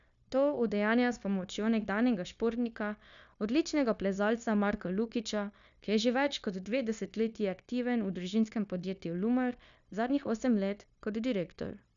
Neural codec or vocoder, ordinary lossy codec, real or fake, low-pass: codec, 16 kHz, 0.9 kbps, LongCat-Audio-Codec; none; fake; 7.2 kHz